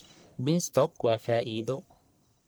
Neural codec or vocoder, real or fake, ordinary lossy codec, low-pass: codec, 44.1 kHz, 1.7 kbps, Pupu-Codec; fake; none; none